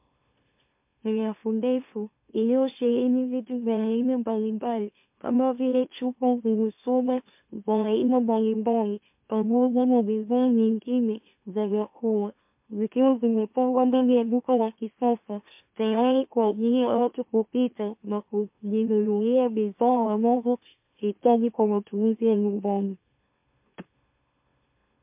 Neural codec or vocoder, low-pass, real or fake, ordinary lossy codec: autoencoder, 44.1 kHz, a latent of 192 numbers a frame, MeloTTS; 3.6 kHz; fake; MP3, 32 kbps